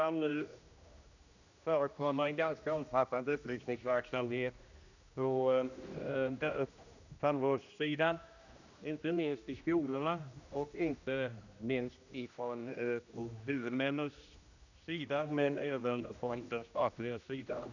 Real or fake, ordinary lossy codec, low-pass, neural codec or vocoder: fake; none; 7.2 kHz; codec, 16 kHz, 1 kbps, X-Codec, HuBERT features, trained on general audio